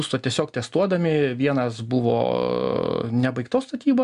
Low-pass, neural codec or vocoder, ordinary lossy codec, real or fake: 10.8 kHz; none; MP3, 96 kbps; real